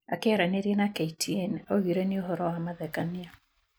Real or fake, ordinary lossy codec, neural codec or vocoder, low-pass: real; none; none; none